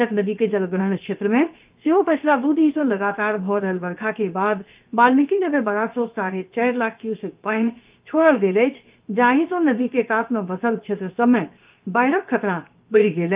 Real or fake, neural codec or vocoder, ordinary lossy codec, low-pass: fake; codec, 16 kHz, 0.7 kbps, FocalCodec; Opus, 24 kbps; 3.6 kHz